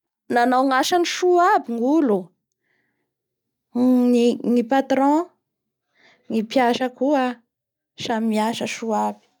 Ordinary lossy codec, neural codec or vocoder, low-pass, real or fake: none; none; 19.8 kHz; real